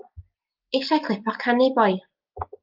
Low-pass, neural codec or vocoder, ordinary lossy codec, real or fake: 5.4 kHz; none; Opus, 32 kbps; real